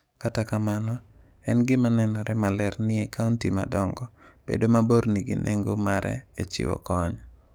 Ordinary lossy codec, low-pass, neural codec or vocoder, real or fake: none; none; codec, 44.1 kHz, 7.8 kbps, DAC; fake